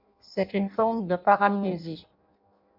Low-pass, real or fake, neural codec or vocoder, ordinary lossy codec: 5.4 kHz; fake; codec, 16 kHz in and 24 kHz out, 0.6 kbps, FireRedTTS-2 codec; MP3, 48 kbps